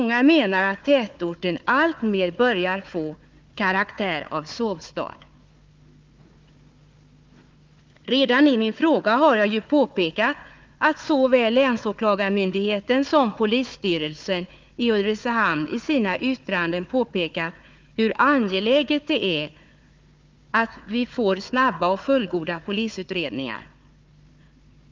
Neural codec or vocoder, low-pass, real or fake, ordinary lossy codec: codec, 16 kHz, 4 kbps, FunCodec, trained on Chinese and English, 50 frames a second; 7.2 kHz; fake; Opus, 32 kbps